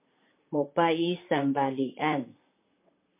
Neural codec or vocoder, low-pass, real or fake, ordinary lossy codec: vocoder, 44.1 kHz, 128 mel bands, Pupu-Vocoder; 3.6 kHz; fake; MP3, 24 kbps